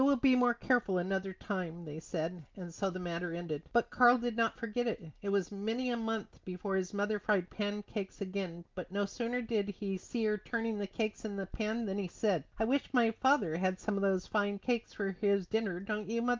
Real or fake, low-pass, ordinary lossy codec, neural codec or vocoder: real; 7.2 kHz; Opus, 24 kbps; none